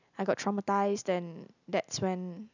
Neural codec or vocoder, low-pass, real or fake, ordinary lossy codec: none; 7.2 kHz; real; none